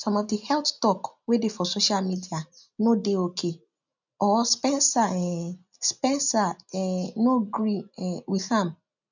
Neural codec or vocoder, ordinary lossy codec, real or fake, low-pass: none; none; real; 7.2 kHz